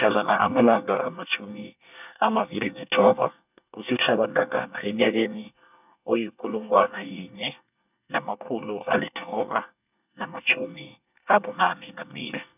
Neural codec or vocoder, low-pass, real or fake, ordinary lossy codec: codec, 24 kHz, 1 kbps, SNAC; 3.6 kHz; fake; none